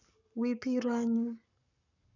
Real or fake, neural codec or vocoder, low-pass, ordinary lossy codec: fake; codec, 44.1 kHz, 7.8 kbps, Pupu-Codec; 7.2 kHz; none